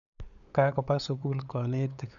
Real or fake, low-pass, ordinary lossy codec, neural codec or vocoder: fake; 7.2 kHz; none; codec, 16 kHz, 8 kbps, FunCodec, trained on LibriTTS, 25 frames a second